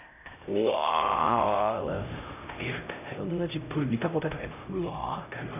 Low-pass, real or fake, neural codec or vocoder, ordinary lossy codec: 3.6 kHz; fake; codec, 16 kHz, 0.5 kbps, X-Codec, HuBERT features, trained on LibriSpeech; none